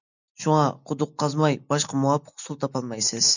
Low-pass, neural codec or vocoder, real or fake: 7.2 kHz; none; real